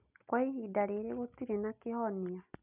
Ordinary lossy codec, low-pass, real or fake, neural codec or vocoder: none; 3.6 kHz; real; none